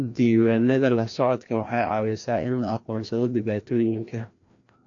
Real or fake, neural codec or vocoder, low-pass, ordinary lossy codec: fake; codec, 16 kHz, 1 kbps, FreqCodec, larger model; 7.2 kHz; AAC, 48 kbps